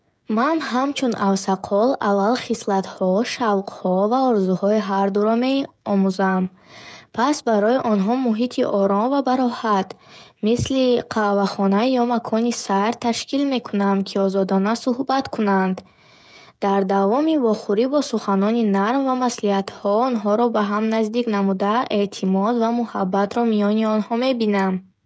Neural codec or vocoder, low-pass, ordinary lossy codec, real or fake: codec, 16 kHz, 16 kbps, FreqCodec, smaller model; none; none; fake